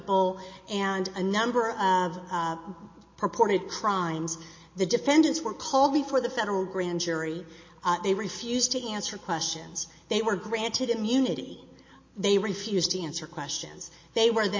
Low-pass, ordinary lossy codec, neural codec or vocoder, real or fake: 7.2 kHz; MP3, 32 kbps; none; real